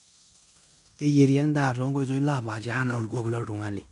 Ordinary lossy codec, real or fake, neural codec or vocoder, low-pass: none; fake; codec, 16 kHz in and 24 kHz out, 0.9 kbps, LongCat-Audio-Codec, fine tuned four codebook decoder; 10.8 kHz